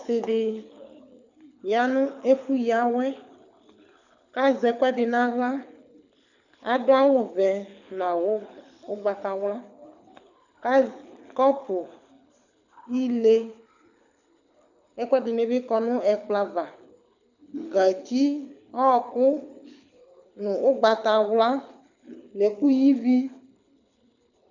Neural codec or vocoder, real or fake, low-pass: codec, 24 kHz, 6 kbps, HILCodec; fake; 7.2 kHz